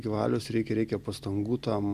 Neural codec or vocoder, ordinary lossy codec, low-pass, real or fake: none; Opus, 64 kbps; 14.4 kHz; real